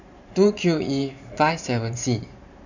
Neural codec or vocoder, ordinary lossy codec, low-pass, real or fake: vocoder, 44.1 kHz, 128 mel bands every 512 samples, BigVGAN v2; none; 7.2 kHz; fake